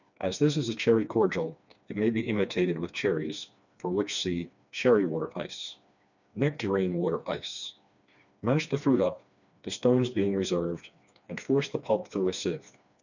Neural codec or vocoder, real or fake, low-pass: codec, 16 kHz, 2 kbps, FreqCodec, smaller model; fake; 7.2 kHz